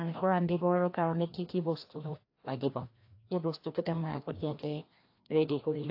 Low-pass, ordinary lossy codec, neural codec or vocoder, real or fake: 5.4 kHz; AAC, 32 kbps; codec, 16 kHz, 1 kbps, FreqCodec, larger model; fake